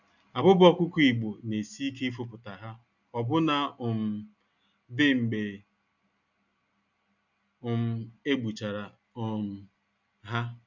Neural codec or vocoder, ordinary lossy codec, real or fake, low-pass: none; none; real; 7.2 kHz